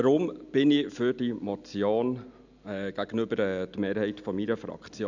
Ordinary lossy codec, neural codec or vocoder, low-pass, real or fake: none; none; 7.2 kHz; real